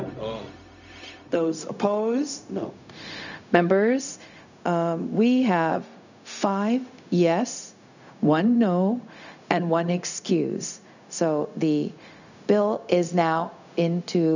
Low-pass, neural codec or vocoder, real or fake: 7.2 kHz; codec, 16 kHz, 0.4 kbps, LongCat-Audio-Codec; fake